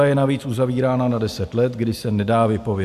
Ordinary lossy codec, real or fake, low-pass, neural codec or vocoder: AAC, 96 kbps; real; 14.4 kHz; none